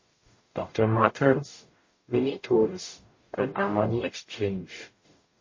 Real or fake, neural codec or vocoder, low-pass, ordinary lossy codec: fake; codec, 44.1 kHz, 0.9 kbps, DAC; 7.2 kHz; MP3, 32 kbps